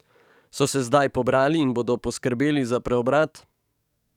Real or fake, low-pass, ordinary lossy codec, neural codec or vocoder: fake; 19.8 kHz; none; codec, 44.1 kHz, 7.8 kbps, DAC